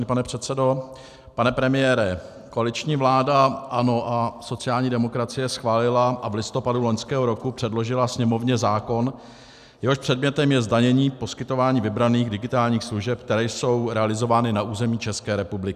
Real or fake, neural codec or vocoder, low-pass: real; none; 14.4 kHz